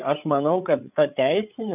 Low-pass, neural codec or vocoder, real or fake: 3.6 kHz; codec, 16 kHz, 8 kbps, FreqCodec, larger model; fake